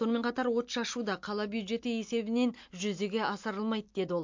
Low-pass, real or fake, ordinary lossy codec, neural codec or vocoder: 7.2 kHz; real; MP3, 48 kbps; none